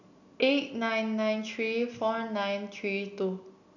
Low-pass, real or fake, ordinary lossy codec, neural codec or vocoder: 7.2 kHz; real; none; none